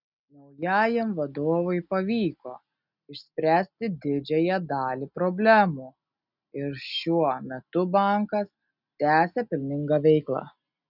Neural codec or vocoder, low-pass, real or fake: none; 5.4 kHz; real